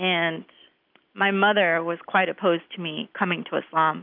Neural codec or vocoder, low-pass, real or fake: none; 5.4 kHz; real